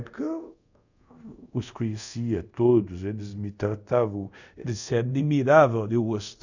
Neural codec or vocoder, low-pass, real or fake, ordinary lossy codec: codec, 24 kHz, 0.5 kbps, DualCodec; 7.2 kHz; fake; none